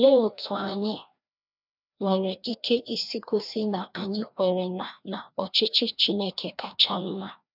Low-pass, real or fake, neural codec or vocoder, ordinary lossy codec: 5.4 kHz; fake; codec, 16 kHz, 1 kbps, FreqCodec, larger model; none